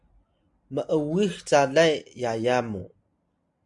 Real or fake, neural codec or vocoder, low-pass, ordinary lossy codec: real; none; 10.8 kHz; MP3, 64 kbps